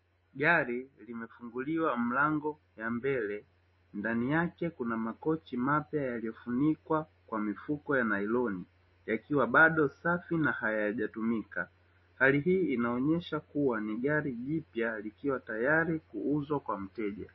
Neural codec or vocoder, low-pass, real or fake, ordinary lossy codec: none; 7.2 kHz; real; MP3, 24 kbps